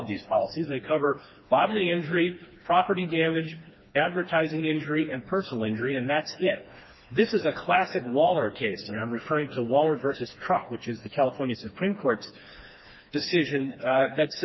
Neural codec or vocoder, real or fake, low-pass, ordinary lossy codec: codec, 16 kHz, 2 kbps, FreqCodec, smaller model; fake; 7.2 kHz; MP3, 24 kbps